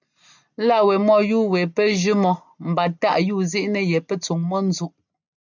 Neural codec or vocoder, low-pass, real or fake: none; 7.2 kHz; real